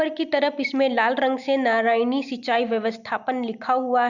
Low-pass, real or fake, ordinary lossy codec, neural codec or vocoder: 7.2 kHz; real; Opus, 64 kbps; none